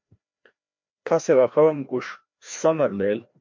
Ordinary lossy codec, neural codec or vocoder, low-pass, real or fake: MP3, 48 kbps; codec, 16 kHz, 1 kbps, FreqCodec, larger model; 7.2 kHz; fake